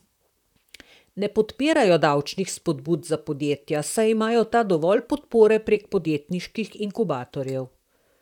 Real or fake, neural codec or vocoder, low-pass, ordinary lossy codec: fake; vocoder, 44.1 kHz, 128 mel bands, Pupu-Vocoder; 19.8 kHz; none